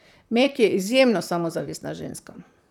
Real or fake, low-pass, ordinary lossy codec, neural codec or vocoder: fake; 19.8 kHz; none; vocoder, 44.1 kHz, 128 mel bands, Pupu-Vocoder